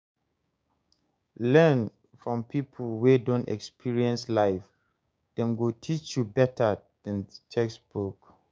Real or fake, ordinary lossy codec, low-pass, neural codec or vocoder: fake; none; none; codec, 16 kHz, 6 kbps, DAC